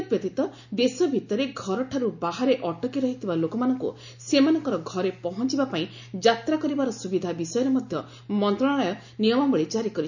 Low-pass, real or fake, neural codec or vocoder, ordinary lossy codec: 7.2 kHz; real; none; none